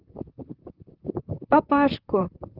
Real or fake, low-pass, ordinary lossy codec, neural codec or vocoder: fake; 5.4 kHz; Opus, 32 kbps; vocoder, 44.1 kHz, 128 mel bands, Pupu-Vocoder